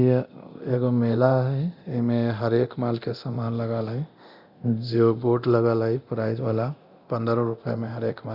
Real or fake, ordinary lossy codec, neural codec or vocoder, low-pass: fake; Opus, 64 kbps; codec, 24 kHz, 0.9 kbps, DualCodec; 5.4 kHz